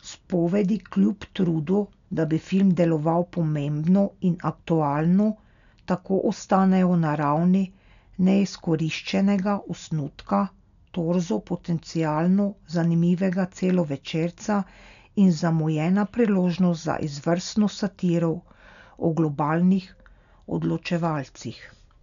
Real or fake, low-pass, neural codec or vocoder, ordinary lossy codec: real; 7.2 kHz; none; none